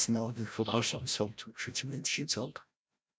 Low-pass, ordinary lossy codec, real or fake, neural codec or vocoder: none; none; fake; codec, 16 kHz, 0.5 kbps, FreqCodec, larger model